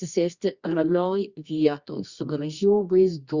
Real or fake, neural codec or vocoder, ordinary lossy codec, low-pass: fake; codec, 24 kHz, 0.9 kbps, WavTokenizer, medium music audio release; Opus, 64 kbps; 7.2 kHz